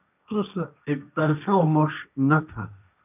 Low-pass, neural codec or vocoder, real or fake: 3.6 kHz; codec, 16 kHz, 1.1 kbps, Voila-Tokenizer; fake